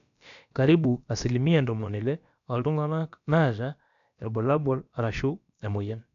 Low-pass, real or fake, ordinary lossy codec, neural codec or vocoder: 7.2 kHz; fake; none; codec, 16 kHz, about 1 kbps, DyCAST, with the encoder's durations